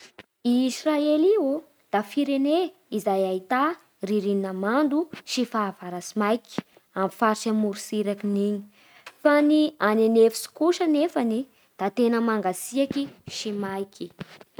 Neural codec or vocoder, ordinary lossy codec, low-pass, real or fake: none; none; none; real